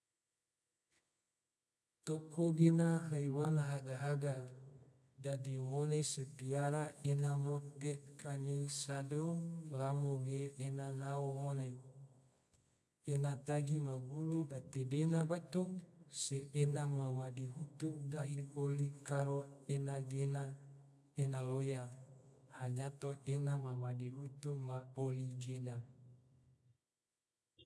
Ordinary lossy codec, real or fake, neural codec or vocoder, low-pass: none; fake; codec, 24 kHz, 0.9 kbps, WavTokenizer, medium music audio release; none